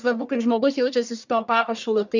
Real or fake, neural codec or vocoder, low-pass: fake; codec, 44.1 kHz, 1.7 kbps, Pupu-Codec; 7.2 kHz